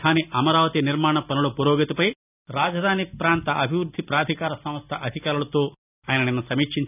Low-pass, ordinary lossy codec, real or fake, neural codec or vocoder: 3.6 kHz; none; real; none